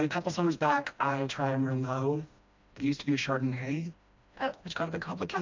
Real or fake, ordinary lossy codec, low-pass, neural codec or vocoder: fake; MP3, 64 kbps; 7.2 kHz; codec, 16 kHz, 1 kbps, FreqCodec, smaller model